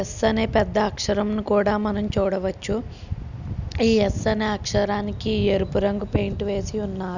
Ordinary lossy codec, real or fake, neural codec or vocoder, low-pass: none; real; none; 7.2 kHz